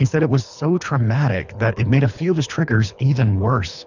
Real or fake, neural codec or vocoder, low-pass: fake; codec, 24 kHz, 3 kbps, HILCodec; 7.2 kHz